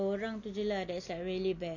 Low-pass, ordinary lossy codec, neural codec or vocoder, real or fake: 7.2 kHz; none; none; real